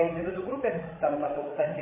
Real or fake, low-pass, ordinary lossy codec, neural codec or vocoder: fake; 3.6 kHz; MP3, 16 kbps; codec, 16 kHz, 16 kbps, FreqCodec, larger model